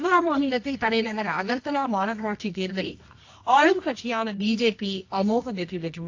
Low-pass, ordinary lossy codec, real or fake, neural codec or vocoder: 7.2 kHz; AAC, 48 kbps; fake; codec, 24 kHz, 0.9 kbps, WavTokenizer, medium music audio release